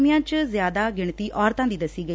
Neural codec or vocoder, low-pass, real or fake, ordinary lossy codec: none; none; real; none